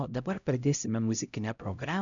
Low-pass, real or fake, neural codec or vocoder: 7.2 kHz; fake; codec, 16 kHz, 0.5 kbps, X-Codec, HuBERT features, trained on LibriSpeech